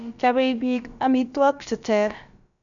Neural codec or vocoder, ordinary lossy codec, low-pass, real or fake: codec, 16 kHz, about 1 kbps, DyCAST, with the encoder's durations; none; 7.2 kHz; fake